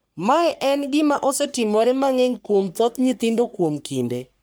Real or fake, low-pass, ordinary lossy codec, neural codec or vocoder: fake; none; none; codec, 44.1 kHz, 3.4 kbps, Pupu-Codec